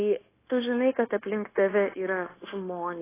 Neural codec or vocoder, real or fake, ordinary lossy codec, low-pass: codec, 16 kHz, 0.9 kbps, LongCat-Audio-Codec; fake; AAC, 16 kbps; 3.6 kHz